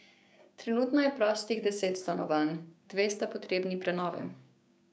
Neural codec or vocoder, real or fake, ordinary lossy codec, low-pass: codec, 16 kHz, 6 kbps, DAC; fake; none; none